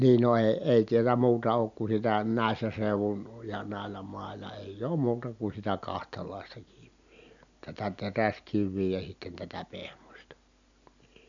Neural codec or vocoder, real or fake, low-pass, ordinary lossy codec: none; real; 7.2 kHz; none